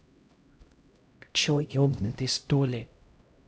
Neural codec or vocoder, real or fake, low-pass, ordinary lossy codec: codec, 16 kHz, 0.5 kbps, X-Codec, HuBERT features, trained on LibriSpeech; fake; none; none